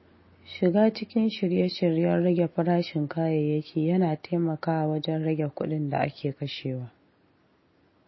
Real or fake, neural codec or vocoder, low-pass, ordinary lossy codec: real; none; 7.2 kHz; MP3, 24 kbps